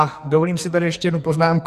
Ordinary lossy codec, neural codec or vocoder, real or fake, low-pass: Opus, 64 kbps; codec, 44.1 kHz, 2.6 kbps, SNAC; fake; 14.4 kHz